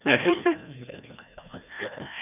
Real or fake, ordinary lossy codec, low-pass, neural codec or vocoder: fake; none; 3.6 kHz; codec, 16 kHz, 1 kbps, FreqCodec, larger model